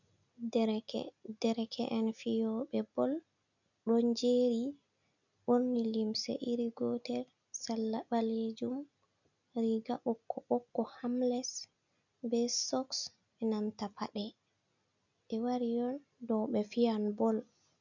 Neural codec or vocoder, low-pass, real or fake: none; 7.2 kHz; real